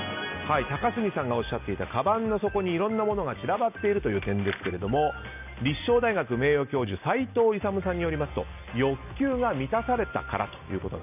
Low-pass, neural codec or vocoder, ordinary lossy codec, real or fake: 3.6 kHz; none; none; real